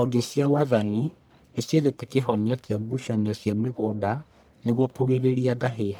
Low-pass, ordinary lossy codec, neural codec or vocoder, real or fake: none; none; codec, 44.1 kHz, 1.7 kbps, Pupu-Codec; fake